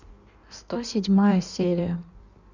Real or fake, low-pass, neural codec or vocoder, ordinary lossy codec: fake; 7.2 kHz; codec, 16 kHz in and 24 kHz out, 1.1 kbps, FireRedTTS-2 codec; none